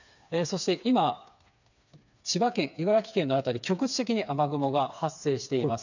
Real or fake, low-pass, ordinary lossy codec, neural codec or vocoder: fake; 7.2 kHz; none; codec, 16 kHz, 4 kbps, FreqCodec, smaller model